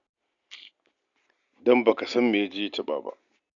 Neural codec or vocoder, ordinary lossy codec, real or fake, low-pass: none; none; real; 7.2 kHz